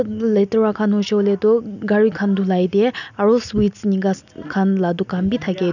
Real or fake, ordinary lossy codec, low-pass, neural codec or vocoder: real; none; 7.2 kHz; none